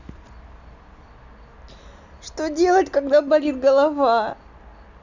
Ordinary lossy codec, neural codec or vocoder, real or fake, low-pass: none; none; real; 7.2 kHz